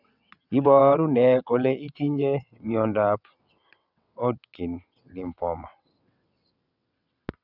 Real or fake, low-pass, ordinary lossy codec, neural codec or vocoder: fake; 5.4 kHz; none; vocoder, 22.05 kHz, 80 mel bands, WaveNeXt